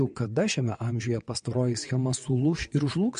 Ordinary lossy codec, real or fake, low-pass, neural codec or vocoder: MP3, 48 kbps; fake; 9.9 kHz; vocoder, 22.05 kHz, 80 mel bands, WaveNeXt